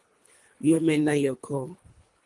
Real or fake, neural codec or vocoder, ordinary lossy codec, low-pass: fake; codec, 24 kHz, 3 kbps, HILCodec; Opus, 24 kbps; 10.8 kHz